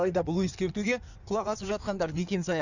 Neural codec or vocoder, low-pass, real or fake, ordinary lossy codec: codec, 16 kHz in and 24 kHz out, 1.1 kbps, FireRedTTS-2 codec; 7.2 kHz; fake; none